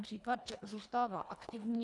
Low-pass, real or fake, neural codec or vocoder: 10.8 kHz; fake; codec, 24 kHz, 1.5 kbps, HILCodec